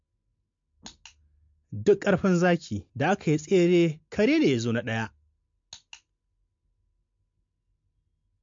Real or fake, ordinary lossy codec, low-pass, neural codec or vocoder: real; MP3, 48 kbps; 7.2 kHz; none